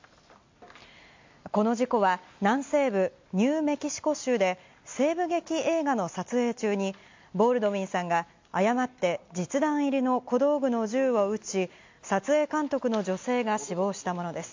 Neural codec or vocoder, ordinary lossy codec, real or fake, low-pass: none; MP3, 48 kbps; real; 7.2 kHz